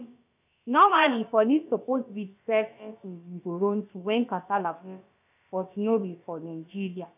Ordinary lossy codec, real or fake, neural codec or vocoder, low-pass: none; fake; codec, 16 kHz, about 1 kbps, DyCAST, with the encoder's durations; 3.6 kHz